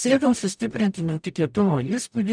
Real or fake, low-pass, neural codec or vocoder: fake; 9.9 kHz; codec, 44.1 kHz, 0.9 kbps, DAC